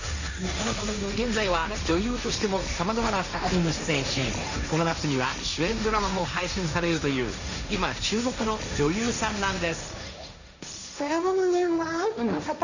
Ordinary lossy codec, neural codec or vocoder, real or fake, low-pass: none; codec, 16 kHz, 1.1 kbps, Voila-Tokenizer; fake; 7.2 kHz